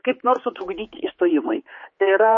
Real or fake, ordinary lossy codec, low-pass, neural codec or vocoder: fake; MP3, 32 kbps; 7.2 kHz; codec, 16 kHz, 4 kbps, X-Codec, HuBERT features, trained on general audio